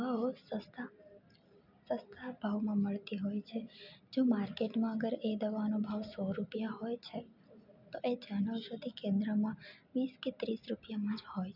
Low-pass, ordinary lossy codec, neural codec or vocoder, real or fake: 5.4 kHz; none; none; real